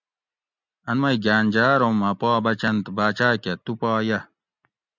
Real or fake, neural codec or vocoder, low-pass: real; none; 7.2 kHz